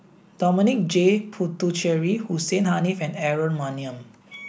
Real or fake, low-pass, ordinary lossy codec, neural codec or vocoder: real; none; none; none